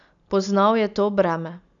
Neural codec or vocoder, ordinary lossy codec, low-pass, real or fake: none; none; 7.2 kHz; real